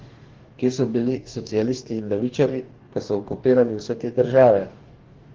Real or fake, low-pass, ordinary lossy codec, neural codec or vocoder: fake; 7.2 kHz; Opus, 16 kbps; codec, 44.1 kHz, 2.6 kbps, DAC